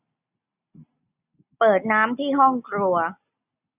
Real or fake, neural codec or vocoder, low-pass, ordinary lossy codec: real; none; 3.6 kHz; none